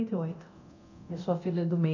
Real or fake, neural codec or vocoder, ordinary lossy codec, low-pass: fake; codec, 24 kHz, 0.9 kbps, DualCodec; none; 7.2 kHz